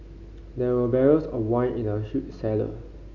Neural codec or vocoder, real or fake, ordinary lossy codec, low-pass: none; real; none; 7.2 kHz